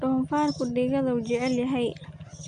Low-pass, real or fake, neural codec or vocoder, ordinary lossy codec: 9.9 kHz; real; none; none